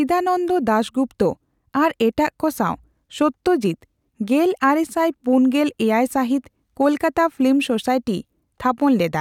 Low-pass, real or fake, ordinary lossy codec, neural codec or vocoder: 19.8 kHz; real; none; none